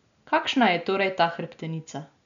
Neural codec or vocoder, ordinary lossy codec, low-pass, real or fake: none; none; 7.2 kHz; real